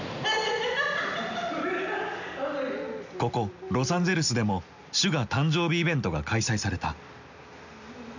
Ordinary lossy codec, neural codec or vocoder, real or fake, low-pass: none; none; real; 7.2 kHz